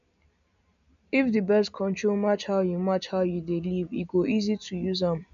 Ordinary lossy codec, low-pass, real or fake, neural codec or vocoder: none; 7.2 kHz; real; none